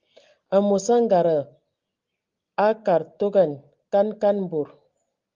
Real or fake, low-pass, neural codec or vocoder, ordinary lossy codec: real; 7.2 kHz; none; Opus, 32 kbps